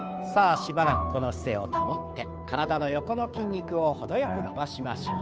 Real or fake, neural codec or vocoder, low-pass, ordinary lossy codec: fake; codec, 16 kHz, 2 kbps, FunCodec, trained on Chinese and English, 25 frames a second; none; none